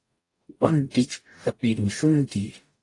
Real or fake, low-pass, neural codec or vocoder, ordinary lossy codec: fake; 10.8 kHz; codec, 44.1 kHz, 0.9 kbps, DAC; AAC, 48 kbps